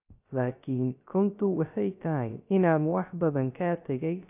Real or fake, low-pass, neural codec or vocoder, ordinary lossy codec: fake; 3.6 kHz; codec, 16 kHz, 0.3 kbps, FocalCodec; AAC, 32 kbps